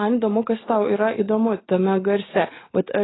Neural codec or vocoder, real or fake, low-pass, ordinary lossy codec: none; real; 7.2 kHz; AAC, 16 kbps